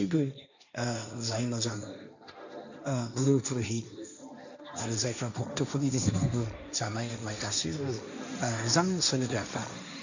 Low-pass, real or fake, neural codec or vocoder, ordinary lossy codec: 7.2 kHz; fake; codec, 16 kHz, 1.1 kbps, Voila-Tokenizer; none